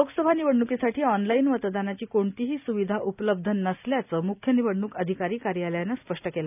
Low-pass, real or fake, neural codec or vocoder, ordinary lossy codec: 3.6 kHz; real; none; none